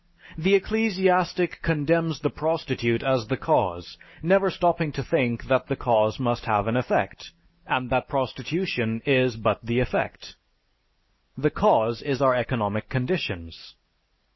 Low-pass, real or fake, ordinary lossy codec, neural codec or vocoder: 7.2 kHz; real; MP3, 24 kbps; none